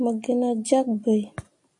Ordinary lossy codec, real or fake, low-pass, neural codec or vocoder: AAC, 64 kbps; real; 10.8 kHz; none